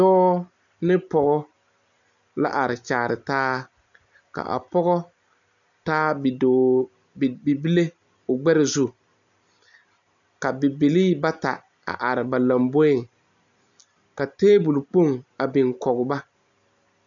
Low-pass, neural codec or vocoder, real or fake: 7.2 kHz; none; real